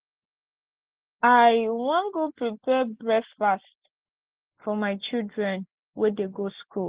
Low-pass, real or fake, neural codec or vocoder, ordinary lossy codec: 3.6 kHz; real; none; Opus, 16 kbps